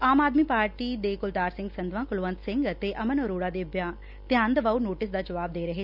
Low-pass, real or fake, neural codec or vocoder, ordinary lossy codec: 5.4 kHz; real; none; none